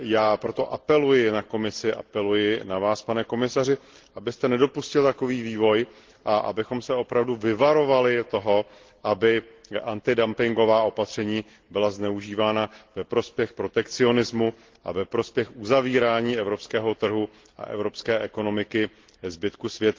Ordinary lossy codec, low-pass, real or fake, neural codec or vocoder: Opus, 16 kbps; 7.2 kHz; real; none